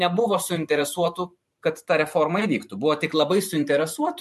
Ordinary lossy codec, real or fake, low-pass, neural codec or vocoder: MP3, 64 kbps; fake; 14.4 kHz; vocoder, 44.1 kHz, 128 mel bands every 512 samples, BigVGAN v2